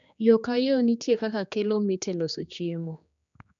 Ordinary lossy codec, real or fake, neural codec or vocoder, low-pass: none; fake; codec, 16 kHz, 2 kbps, X-Codec, HuBERT features, trained on general audio; 7.2 kHz